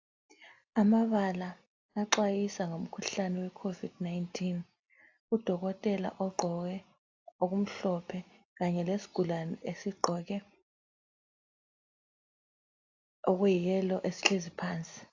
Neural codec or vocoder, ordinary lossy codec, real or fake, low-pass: none; AAC, 48 kbps; real; 7.2 kHz